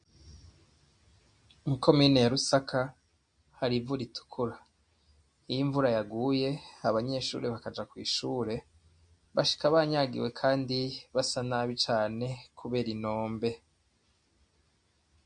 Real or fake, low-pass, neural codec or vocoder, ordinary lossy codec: real; 9.9 kHz; none; MP3, 48 kbps